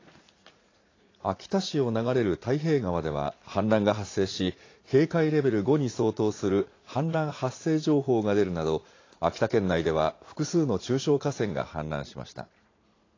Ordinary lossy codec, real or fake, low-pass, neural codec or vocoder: AAC, 32 kbps; fake; 7.2 kHz; vocoder, 44.1 kHz, 80 mel bands, Vocos